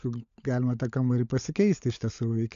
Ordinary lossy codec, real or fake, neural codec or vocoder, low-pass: AAC, 64 kbps; fake; codec, 16 kHz, 16 kbps, FunCodec, trained on LibriTTS, 50 frames a second; 7.2 kHz